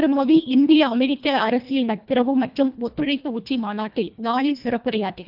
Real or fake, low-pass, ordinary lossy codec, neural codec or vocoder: fake; 5.4 kHz; none; codec, 24 kHz, 1.5 kbps, HILCodec